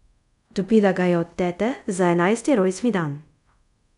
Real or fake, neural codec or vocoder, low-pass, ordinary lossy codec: fake; codec, 24 kHz, 0.5 kbps, DualCodec; 10.8 kHz; none